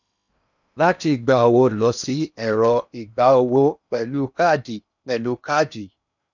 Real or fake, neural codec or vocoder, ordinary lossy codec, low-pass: fake; codec, 16 kHz in and 24 kHz out, 0.8 kbps, FocalCodec, streaming, 65536 codes; none; 7.2 kHz